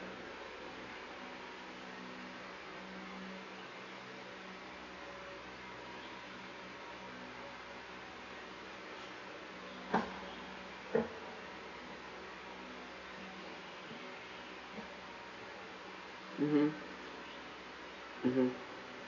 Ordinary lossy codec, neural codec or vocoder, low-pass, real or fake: none; none; 7.2 kHz; real